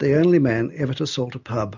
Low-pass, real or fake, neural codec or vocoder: 7.2 kHz; real; none